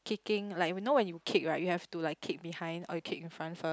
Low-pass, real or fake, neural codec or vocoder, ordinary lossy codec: none; real; none; none